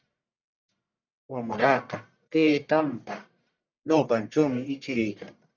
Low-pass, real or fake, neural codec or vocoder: 7.2 kHz; fake; codec, 44.1 kHz, 1.7 kbps, Pupu-Codec